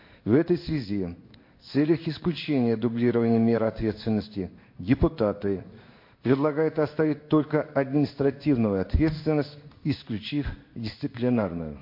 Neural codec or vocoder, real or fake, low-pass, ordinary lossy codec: codec, 16 kHz in and 24 kHz out, 1 kbps, XY-Tokenizer; fake; 5.4 kHz; MP3, 32 kbps